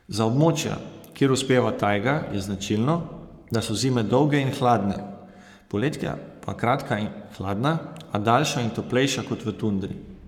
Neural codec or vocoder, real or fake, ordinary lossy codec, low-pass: codec, 44.1 kHz, 7.8 kbps, Pupu-Codec; fake; none; 19.8 kHz